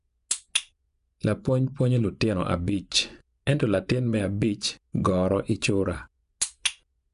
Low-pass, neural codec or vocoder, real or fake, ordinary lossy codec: 10.8 kHz; vocoder, 24 kHz, 100 mel bands, Vocos; fake; none